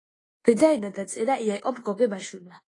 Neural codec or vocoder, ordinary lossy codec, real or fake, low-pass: codec, 24 kHz, 1.2 kbps, DualCodec; AAC, 32 kbps; fake; 10.8 kHz